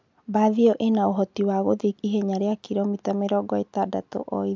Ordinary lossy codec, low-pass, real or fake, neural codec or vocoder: MP3, 64 kbps; 7.2 kHz; real; none